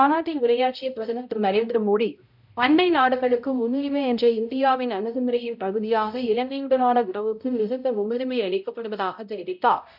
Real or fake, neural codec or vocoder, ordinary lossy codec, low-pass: fake; codec, 16 kHz, 0.5 kbps, X-Codec, HuBERT features, trained on balanced general audio; none; 5.4 kHz